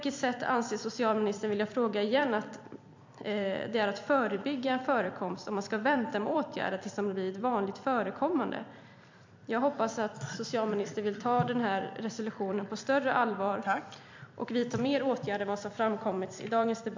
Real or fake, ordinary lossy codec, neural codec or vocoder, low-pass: fake; MP3, 48 kbps; vocoder, 44.1 kHz, 128 mel bands every 256 samples, BigVGAN v2; 7.2 kHz